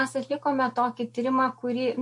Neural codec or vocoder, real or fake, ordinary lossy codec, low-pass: none; real; MP3, 48 kbps; 10.8 kHz